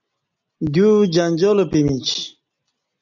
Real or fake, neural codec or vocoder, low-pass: real; none; 7.2 kHz